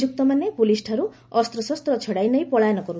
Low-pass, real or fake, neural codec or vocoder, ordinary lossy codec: none; real; none; none